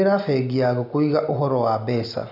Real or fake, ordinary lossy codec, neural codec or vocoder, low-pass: real; none; none; 5.4 kHz